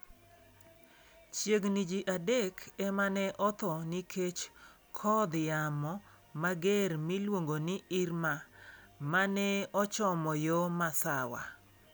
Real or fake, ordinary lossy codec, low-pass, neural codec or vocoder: real; none; none; none